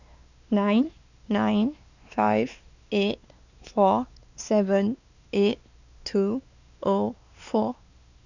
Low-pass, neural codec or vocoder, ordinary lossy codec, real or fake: 7.2 kHz; codec, 16 kHz, 2 kbps, X-Codec, WavLM features, trained on Multilingual LibriSpeech; none; fake